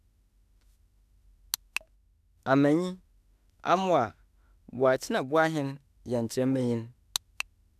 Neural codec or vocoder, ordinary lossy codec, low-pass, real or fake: autoencoder, 48 kHz, 32 numbers a frame, DAC-VAE, trained on Japanese speech; none; 14.4 kHz; fake